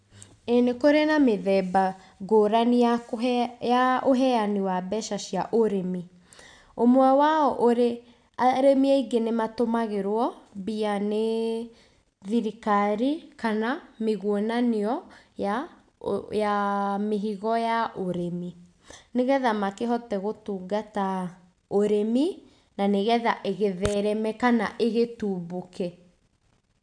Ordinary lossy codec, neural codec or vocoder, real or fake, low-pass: none; none; real; 9.9 kHz